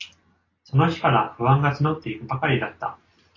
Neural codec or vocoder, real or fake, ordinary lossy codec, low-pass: none; real; AAC, 32 kbps; 7.2 kHz